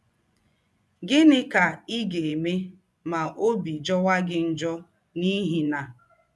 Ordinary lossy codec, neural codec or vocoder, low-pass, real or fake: none; vocoder, 24 kHz, 100 mel bands, Vocos; none; fake